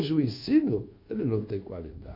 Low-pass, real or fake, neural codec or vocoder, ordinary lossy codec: 5.4 kHz; fake; codec, 24 kHz, 1.2 kbps, DualCodec; MP3, 32 kbps